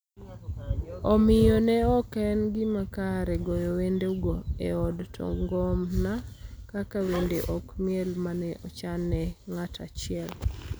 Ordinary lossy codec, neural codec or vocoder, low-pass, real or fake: none; none; none; real